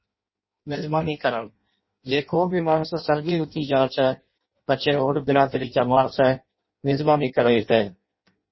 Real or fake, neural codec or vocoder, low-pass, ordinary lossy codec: fake; codec, 16 kHz in and 24 kHz out, 0.6 kbps, FireRedTTS-2 codec; 7.2 kHz; MP3, 24 kbps